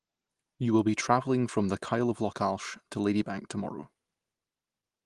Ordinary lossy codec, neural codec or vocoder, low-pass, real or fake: Opus, 24 kbps; none; 10.8 kHz; real